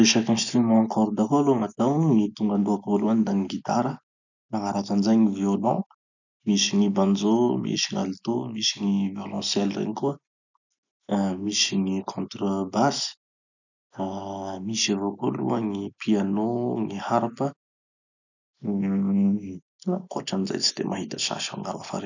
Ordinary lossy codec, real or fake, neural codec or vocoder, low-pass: none; real; none; 7.2 kHz